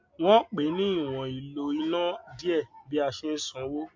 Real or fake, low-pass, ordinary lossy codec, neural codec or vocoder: real; 7.2 kHz; MP3, 64 kbps; none